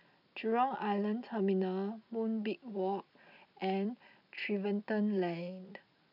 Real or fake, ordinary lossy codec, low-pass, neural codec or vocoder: real; none; 5.4 kHz; none